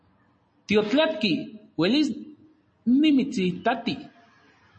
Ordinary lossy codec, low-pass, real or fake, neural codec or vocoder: MP3, 32 kbps; 9.9 kHz; real; none